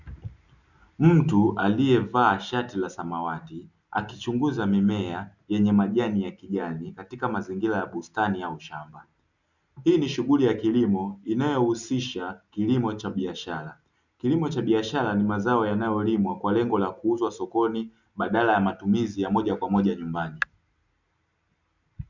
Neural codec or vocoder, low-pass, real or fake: none; 7.2 kHz; real